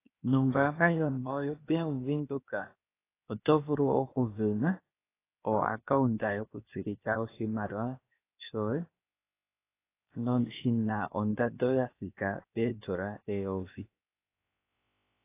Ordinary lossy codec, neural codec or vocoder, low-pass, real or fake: AAC, 24 kbps; codec, 16 kHz, 0.7 kbps, FocalCodec; 3.6 kHz; fake